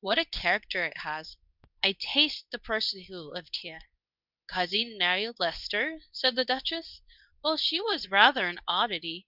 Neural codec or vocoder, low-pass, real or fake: codec, 24 kHz, 0.9 kbps, WavTokenizer, medium speech release version 2; 5.4 kHz; fake